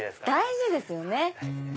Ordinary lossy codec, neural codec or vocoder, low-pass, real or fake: none; none; none; real